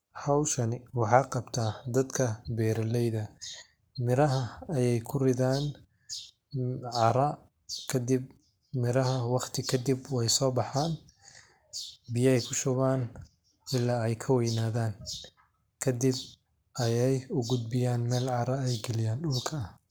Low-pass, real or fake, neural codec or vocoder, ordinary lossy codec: none; fake; codec, 44.1 kHz, 7.8 kbps, Pupu-Codec; none